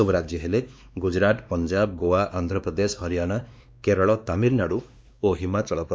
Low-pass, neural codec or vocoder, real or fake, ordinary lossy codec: none; codec, 16 kHz, 2 kbps, X-Codec, WavLM features, trained on Multilingual LibriSpeech; fake; none